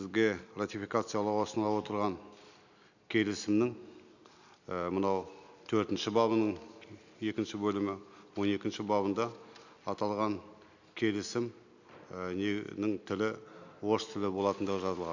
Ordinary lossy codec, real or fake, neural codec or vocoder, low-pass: none; real; none; 7.2 kHz